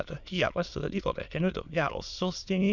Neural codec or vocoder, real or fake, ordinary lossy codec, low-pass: autoencoder, 22.05 kHz, a latent of 192 numbers a frame, VITS, trained on many speakers; fake; none; 7.2 kHz